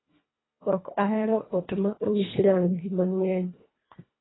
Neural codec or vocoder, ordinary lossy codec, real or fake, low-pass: codec, 24 kHz, 1.5 kbps, HILCodec; AAC, 16 kbps; fake; 7.2 kHz